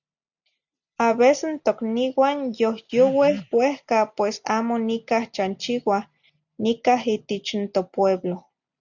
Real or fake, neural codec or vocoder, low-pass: real; none; 7.2 kHz